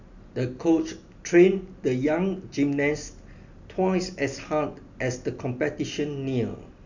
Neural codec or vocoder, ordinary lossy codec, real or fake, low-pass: none; none; real; 7.2 kHz